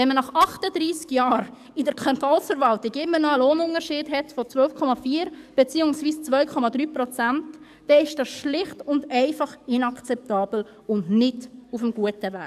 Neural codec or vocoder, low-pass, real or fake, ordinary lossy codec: codec, 44.1 kHz, 7.8 kbps, DAC; 14.4 kHz; fake; none